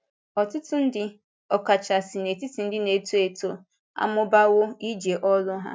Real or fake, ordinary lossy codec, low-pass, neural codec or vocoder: real; none; none; none